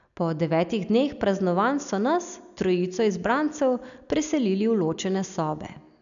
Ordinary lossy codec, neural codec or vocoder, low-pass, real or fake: none; none; 7.2 kHz; real